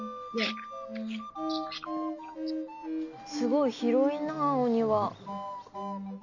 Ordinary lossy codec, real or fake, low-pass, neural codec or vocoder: none; real; 7.2 kHz; none